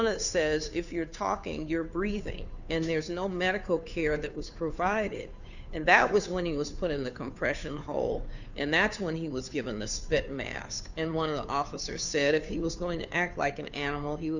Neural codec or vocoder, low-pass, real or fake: codec, 16 kHz, 2 kbps, FunCodec, trained on Chinese and English, 25 frames a second; 7.2 kHz; fake